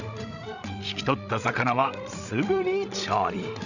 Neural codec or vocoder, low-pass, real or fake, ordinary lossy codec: codec, 16 kHz, 16 kbps, FreqCodec, larger model; 7.2 kHz; fake; none